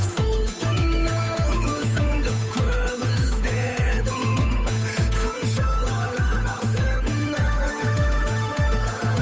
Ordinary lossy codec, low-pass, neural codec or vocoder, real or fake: none; none; codec, 16 kHz, 8 kbps, FunCodec, trained on Chinese and English, 25 frames a second; fake